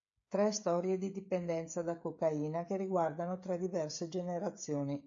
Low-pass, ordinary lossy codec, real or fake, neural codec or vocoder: 7.2 kHz; AAC, 64 kbps; fake; codec, 16 kHz, 16 kbps, FreqCodec, smaller model